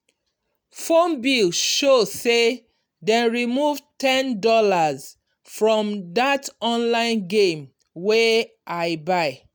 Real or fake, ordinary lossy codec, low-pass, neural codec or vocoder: real; none; none; none